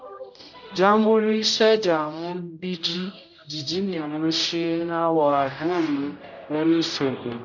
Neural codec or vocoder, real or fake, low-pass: codec, 16 kHz, 0.5 kbps, X-Codec, HuBERT features, trained on general audio; fake; 7.2 kHz